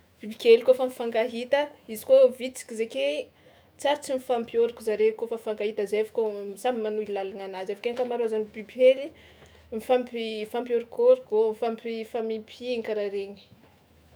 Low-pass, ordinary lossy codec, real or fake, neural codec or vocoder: none; none; fake; autoencoder, 48 kHz, 128 numbers a frame, DAC-VAE, trained on Japanese speech